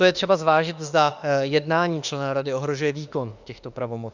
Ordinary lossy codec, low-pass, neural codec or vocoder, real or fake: Opus, 64 kbps; 7.2 kHz; autoencoder, 48 kHz, 32 numbers a frame, DAC-VAE, trained on Japanese speech; fake